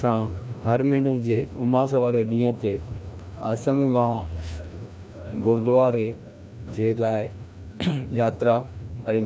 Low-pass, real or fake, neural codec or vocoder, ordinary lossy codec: none; fake; codec, 16 kHz, 1 kbps, FreqCodec, larger model; none